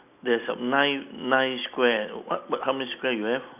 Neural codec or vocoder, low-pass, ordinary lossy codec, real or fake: none; 3.6 kHz; none; real